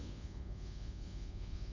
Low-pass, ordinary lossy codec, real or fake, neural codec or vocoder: 7.2 kHz; none; fake; codec, 24 kHz, 1.2 kbps, DualCodec